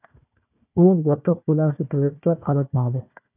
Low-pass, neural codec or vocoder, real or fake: 3.6 kHz; codec, 16 kHz, 1 kbps, FunCodec, trained on Chinese and English, 50 frames a second; fake